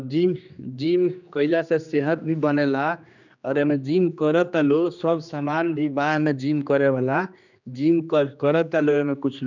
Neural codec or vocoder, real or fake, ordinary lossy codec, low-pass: codec, 16 kHz, 2 kbps, X-Codec, HuBERT features, trained on general audio; fake; none; 7.2 kHz